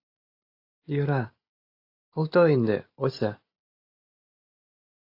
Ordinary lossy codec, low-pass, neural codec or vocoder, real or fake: AAC, 32 kbps; 5.4 kHz; none; real